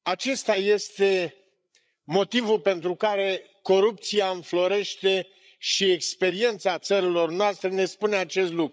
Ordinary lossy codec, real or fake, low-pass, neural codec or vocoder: none; fake; none; codec, 16 kHz, 8 kbps, FreqCodec, larger model